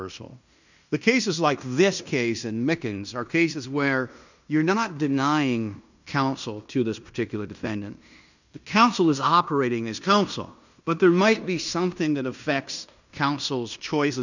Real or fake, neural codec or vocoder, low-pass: fake; codec, 16 kHz in and 24 kHz out, 0.9 kbps, LongCat-Audio-Codec, fine tuned four codebook decoder; 7.2 kHz